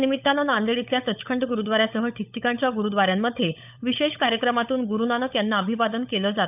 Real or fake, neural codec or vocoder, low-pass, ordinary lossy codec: fake; codec, 16 kHz, 16 kbps, FunCodec, trained on LibriTTS, 50 frames a second; 3.6 kHz; none